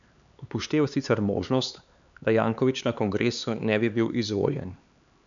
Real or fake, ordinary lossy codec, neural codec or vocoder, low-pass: fake; none; codec, 16 kHz, 4 kbps, X-Codec, HuBERT features, trained on LibriSpeech; 7.2 kHz